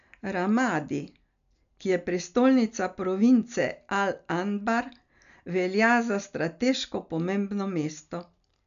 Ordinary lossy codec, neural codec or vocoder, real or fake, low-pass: none; none; real; 7.2 kHz